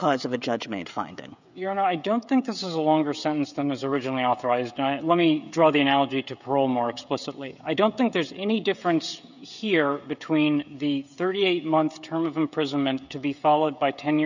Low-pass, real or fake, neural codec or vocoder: 7.2 kHz; fake; codec, 16 kHz, 16 kbps, FreqCodec, smaller model